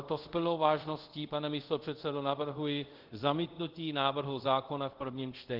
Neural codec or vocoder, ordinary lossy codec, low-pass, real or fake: codec, 24 kHz, 0.5 kbps, DualCodec; Opus, 16 kbps; 5.4 kHz; fake